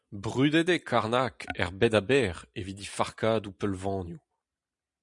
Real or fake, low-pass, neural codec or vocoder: real; 10.8 kHz; none